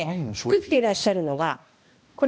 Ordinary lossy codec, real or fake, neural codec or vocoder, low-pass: none; fake; codec, 16 kHz, 1 kbps, X-Codec, HuBERT features, trained on balanced general audio; none